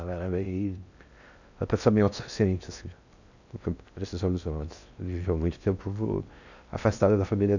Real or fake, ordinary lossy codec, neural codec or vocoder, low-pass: fake; none; codec, 16 kHz in and 24 kHz out, 0.6 kbps, FocalCodec, streaming, 4096 codes; 7.2 kHz